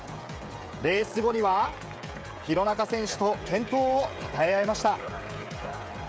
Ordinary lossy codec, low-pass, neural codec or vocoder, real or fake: none; none; codec, 16 kHz, 16 kbps, FreqCodec, smaller model; fake